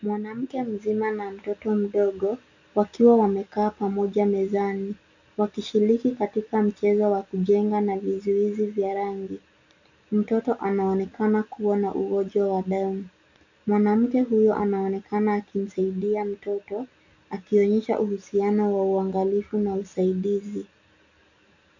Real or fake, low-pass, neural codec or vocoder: real; 7.2 kHz; none